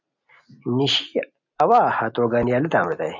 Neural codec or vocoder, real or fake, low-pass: none; real; 7.2 kHz